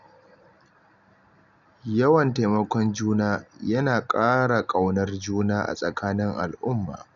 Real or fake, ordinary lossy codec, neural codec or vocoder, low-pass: real; none; none; 7.2 kHz